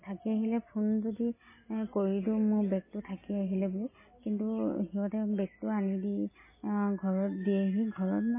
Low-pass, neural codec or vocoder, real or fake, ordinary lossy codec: 3.6 kHz; none; real; MP3, 16 kbps